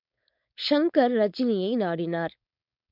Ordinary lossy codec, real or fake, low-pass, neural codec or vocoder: MP3, 48 kbps; fake; 5.4 kHz; codec, 16 kHz, 4.8 kbps, FACodec